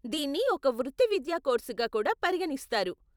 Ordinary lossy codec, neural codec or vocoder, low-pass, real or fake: none; vocoder, 44.1 kHz, 128 mel bands every 256 samples, BigVGAN v2; 19.8 kHz; fake